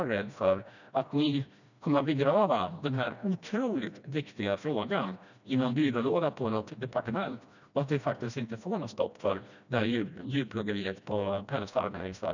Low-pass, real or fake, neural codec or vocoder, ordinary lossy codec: 7.2 kHz; fake; codec, 16 kHz, 1 kbps, FreqCodec, smaller model; none